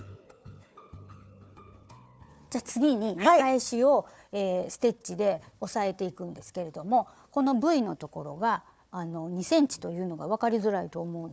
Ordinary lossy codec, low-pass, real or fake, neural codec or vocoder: none; none; fake; codec, 16 kHz, 4 kbps, FunCodec, trained on Chinese and English, 50 frames a second